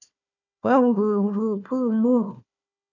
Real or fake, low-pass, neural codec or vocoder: fake; 7.2 kHz; codec, 16 kHz, 1 kbps, FunCodec, trained on Chinese and English, 50 frames a second